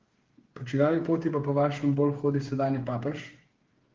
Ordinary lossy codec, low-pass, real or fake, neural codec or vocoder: Opus, 16 kbps; 7.2 kHz; fake; codec, 16 kHz, 8 kbps, FreqCodec, smaller model